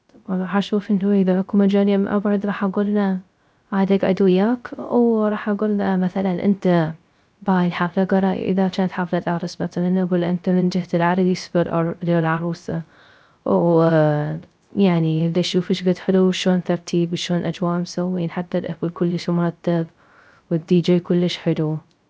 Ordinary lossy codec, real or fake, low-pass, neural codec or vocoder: none; fake; none; codec, 16 kHz, 0.3 kbps, FocalCodec